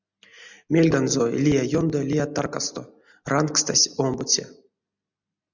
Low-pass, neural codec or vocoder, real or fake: 7.2 kHz; none; real